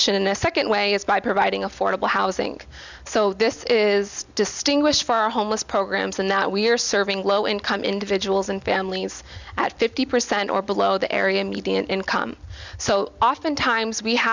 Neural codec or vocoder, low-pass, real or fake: none; 7.2 kHz; real